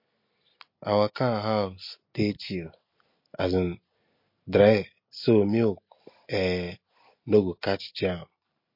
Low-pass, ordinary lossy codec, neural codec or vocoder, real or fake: 5.4 kHz; MP3, 32 kbps; none; real